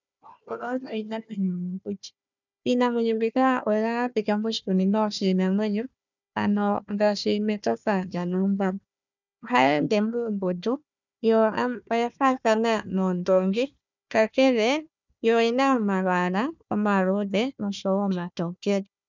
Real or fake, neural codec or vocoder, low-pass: fake; codec, 16 kHz, 1 kbps, FunCodec, trained on Chinese and English, 50 frames a second; 7.2 kHz